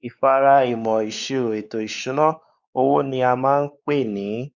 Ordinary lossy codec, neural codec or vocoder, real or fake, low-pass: Opus, 64 kbps; codec, 16 kHz, 4 kbps, X-Codec, WavLM features, trained on Multilingual LibriSpeech; fake; 7.2 kHz